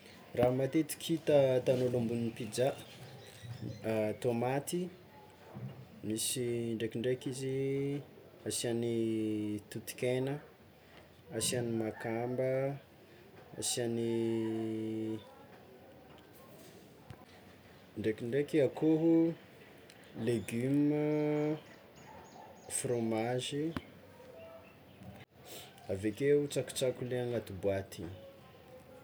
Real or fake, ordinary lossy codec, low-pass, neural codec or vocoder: real; none; none; none